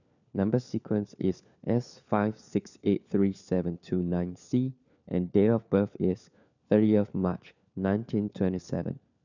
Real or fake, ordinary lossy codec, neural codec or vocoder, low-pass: fake; none; codec, 16 kHz, 4 kbps, FunCodec, trained on LibriTTS, 50 frames a second; 7.2 kHz